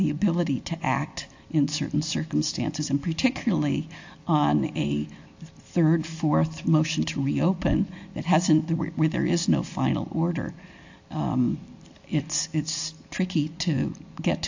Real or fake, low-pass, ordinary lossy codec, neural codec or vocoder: real; 7.2 kHz; AAC, 48 kbps; none